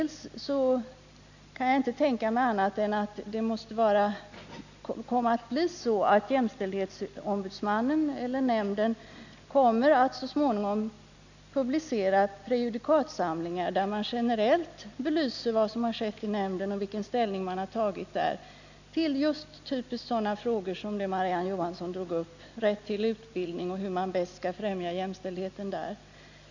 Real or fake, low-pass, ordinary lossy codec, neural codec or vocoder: real; 7.2 kHz; none; none